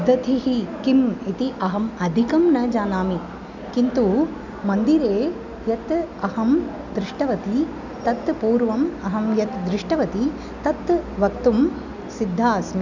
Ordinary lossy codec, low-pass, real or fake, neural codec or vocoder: none; 7.2 kHz; real; none